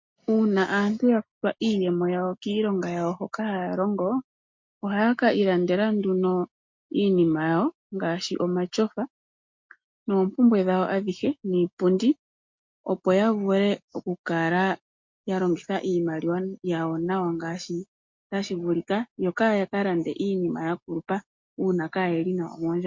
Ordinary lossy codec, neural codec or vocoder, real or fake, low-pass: MP3, 48 kbps; none; real; 7.2 kHz